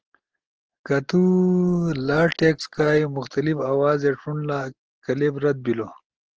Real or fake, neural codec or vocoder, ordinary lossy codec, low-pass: real; none; Opus, 16 kbps; 7.2 kHz